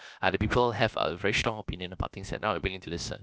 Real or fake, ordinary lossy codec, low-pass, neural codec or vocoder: fake; none; none; codec, 16 kHz, about 1 kbps, DyCAST, with the encoder's durations